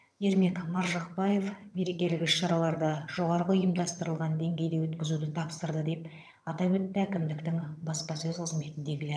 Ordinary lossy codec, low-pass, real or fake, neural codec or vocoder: none; none; fake; vocoder, 22.05 kHz, 80 mel bands, HiFi-GAN